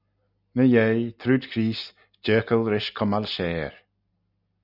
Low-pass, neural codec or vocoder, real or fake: 5.4 kHz; none; real